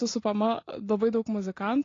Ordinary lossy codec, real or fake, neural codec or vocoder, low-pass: AAC, 32 kbps; real; none; 7.2 kHz